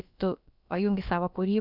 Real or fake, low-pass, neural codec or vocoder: fake; 5.4 kHz; codec, 16 kHz, about 1 kbps, DyCAST, with the encoder's durations